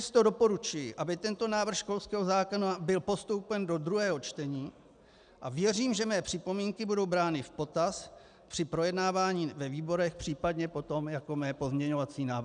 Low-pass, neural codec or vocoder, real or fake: 9.9 kHz; none; real